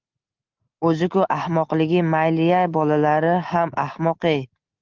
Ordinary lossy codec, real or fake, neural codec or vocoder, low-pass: Opus, 24 kbps; real; none; 7.2 kHz